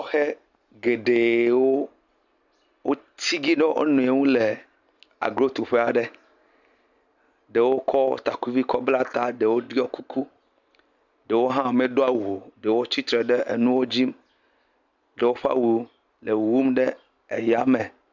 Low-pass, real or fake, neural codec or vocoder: 7.2 kHz; real; none